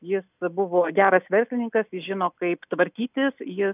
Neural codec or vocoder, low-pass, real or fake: none; 3.6 kHz; real